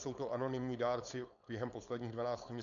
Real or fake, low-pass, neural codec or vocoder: fake; 7.2 kHz; codec, 16 kHz, 4.8 kbps, FACodec